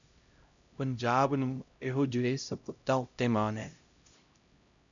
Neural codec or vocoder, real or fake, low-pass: codec, 16 kHz, 0.5 kbps, X-Codec, HuBERT features, trained on LibriSpeech; fake; 7.2 kHz